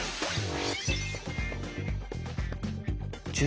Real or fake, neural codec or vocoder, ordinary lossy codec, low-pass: real; none; none; none